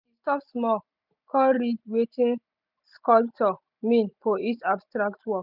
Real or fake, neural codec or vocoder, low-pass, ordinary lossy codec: real; none; 5.4 kHz; none